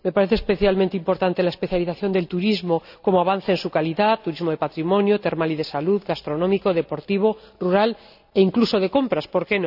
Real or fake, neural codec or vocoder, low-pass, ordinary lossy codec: real; none; 5.4 kHz; none